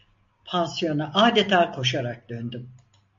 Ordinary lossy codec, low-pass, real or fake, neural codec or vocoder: MP3, 48 kbps; 7.2 kHz; real; none